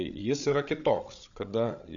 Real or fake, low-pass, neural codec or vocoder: fake; 7.2 kHz; codec, 16 kHz, 8 kbps, FreqCodec, larger model